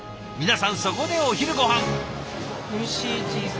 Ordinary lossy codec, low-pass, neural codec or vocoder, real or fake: none; none; none; real